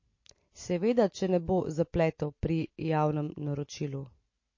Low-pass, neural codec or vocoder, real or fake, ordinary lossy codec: 7.2 kHz; none; real; MP3, 32 kbps